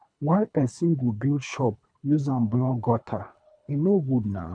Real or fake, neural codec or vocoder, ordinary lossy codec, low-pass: fake; codec, 24 kHz, 3 kbps, HILCodec; none; 9.9 kHz